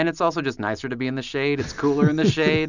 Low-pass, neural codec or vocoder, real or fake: 7.2 kHz; none; real